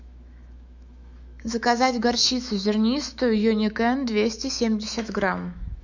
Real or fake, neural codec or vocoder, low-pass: fake; autoencoder, 48 kHz, 128 numbers a frame, DAC-VAE, trained on Japanese speech; 7.2 kHz